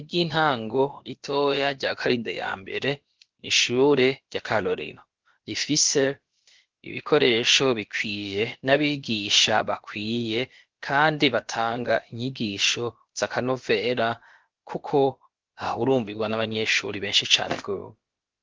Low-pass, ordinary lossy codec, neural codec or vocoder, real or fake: 7.2 kHz; Opus, 16 kbps; codec, 16 kHz, about 1 kbps, DyCAST, with the encoder's durations; fake